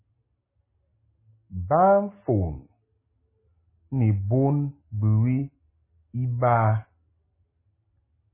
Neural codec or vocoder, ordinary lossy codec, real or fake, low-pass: none; MP3, 16 kbps; real; 3.6 kHz